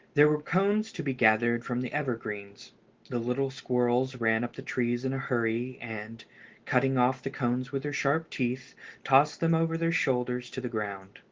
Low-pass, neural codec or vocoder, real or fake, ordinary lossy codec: 7.2 kHz; none; real; Opus, 16 kbps